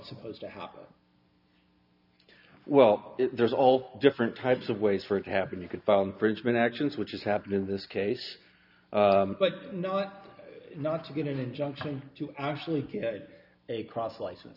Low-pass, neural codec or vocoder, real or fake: 5.4 kHz; none; real